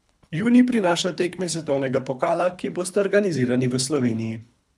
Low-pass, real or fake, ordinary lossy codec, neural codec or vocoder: none; fake; none; codec, 24 kHz, 3 kbps, HILCodec